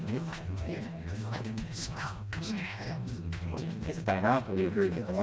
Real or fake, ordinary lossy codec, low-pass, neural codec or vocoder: fake; none; none; codec, 16 kHz, 1 kbps, FreqCodec, smaller model